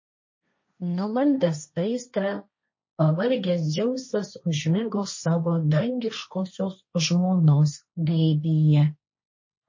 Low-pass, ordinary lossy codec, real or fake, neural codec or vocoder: 7.2 kHz; MP3, 32 kbps; fake; codec, 16 kHz, 1.1 kbps, Voila-Tokenizer